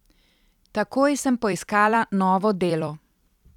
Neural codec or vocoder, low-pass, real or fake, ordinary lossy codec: vocoder, 44.1 kHz, 128 mel bands every 256 samples, BigVGAN v2; 19.8 kHz; fake; none